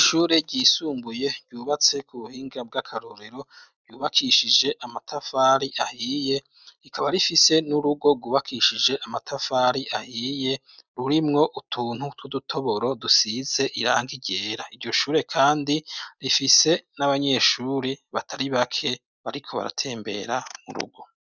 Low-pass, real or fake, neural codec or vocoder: 7.2 kHz; real; none